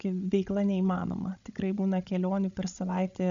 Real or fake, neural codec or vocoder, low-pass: fake; codec, 16 kHz, 16 kbps, FreqCodec, larger model; 7.2 kHz